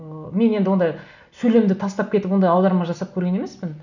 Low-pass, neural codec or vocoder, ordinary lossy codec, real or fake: 7.2 kHz; none; none; real